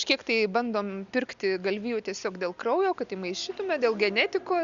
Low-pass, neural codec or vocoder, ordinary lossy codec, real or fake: 7.2 kHz; none; Opus, 64 kbps; real